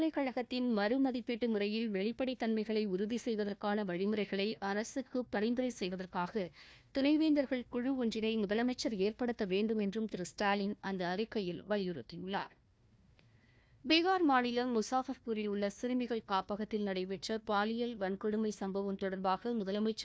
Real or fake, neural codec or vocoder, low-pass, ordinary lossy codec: fake; codec, 16 kHz, 1 kbps, FunCodec, trained on Chinese and English, 50 frames a second; none; none